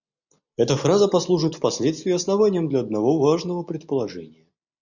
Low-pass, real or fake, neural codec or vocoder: 7.2 kHz; real; none